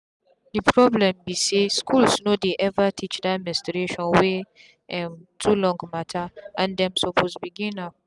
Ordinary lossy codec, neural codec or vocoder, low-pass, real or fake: none; none; 10.8 kHz; real